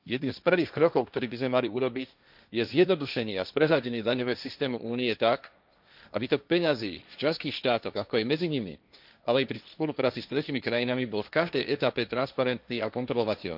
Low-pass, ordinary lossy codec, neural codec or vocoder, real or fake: 5.4 kHz; none; codec, 16 kHz, 1.1 kbps, Voila-Tokenizer; fake